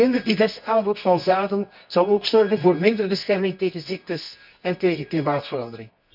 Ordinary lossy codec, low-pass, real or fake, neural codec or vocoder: none; 5.4 kHz; fake; codec, 24 kHz, 0.9 kbps, WavTokenizer, medium music audio release